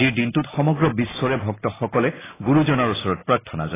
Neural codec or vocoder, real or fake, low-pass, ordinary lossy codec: none; real; 3.6 kHz; AAC, 16 kbps